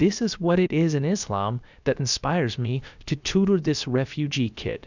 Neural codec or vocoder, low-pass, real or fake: codec, 16 kHz, about 1 kbps, DyCAST, with the encoder's durations; 7.2 kHz; fake